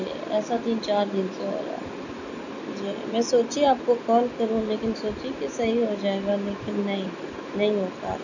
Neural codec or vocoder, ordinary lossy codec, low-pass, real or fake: none; none; 7.2 kHz; real